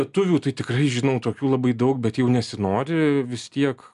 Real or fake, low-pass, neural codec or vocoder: real; 10.8 kHz; none